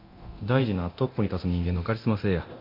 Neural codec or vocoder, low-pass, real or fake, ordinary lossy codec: codec, 24 kHz, 0.9 kbps, DualCodec; 5.4 kHz; fake; MP3, 32 kbps